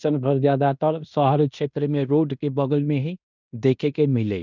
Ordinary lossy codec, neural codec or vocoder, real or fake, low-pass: none; codec, 16 kHz in and 24 kHz out, 0.9 kbps, LongCat-Audio-Codec, fine tuned four codebook decoder; fake; 7.2 kHz